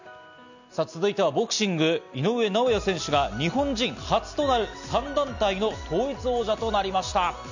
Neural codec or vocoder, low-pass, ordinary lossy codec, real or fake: none; 7.2 kHz; none; real